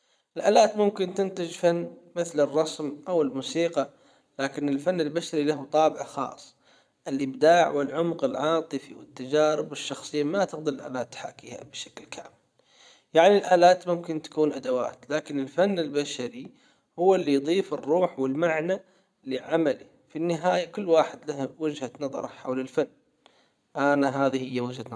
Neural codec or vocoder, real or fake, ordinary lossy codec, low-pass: vocoder, 22.05 kHz, 80 mel bands, Vocos; fake; none; none